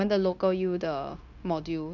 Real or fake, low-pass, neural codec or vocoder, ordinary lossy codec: fake; 7.2 kHz; codec, 16 kHz, 0.9 kbps, LongCat-Audio-Codec; none